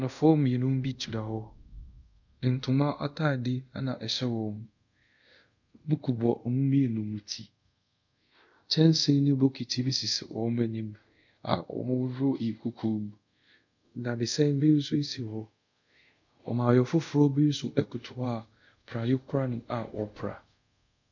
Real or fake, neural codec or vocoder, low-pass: fake; codec, 24 kHz, 0.5 kbps, DualCodec; 7.2 kHz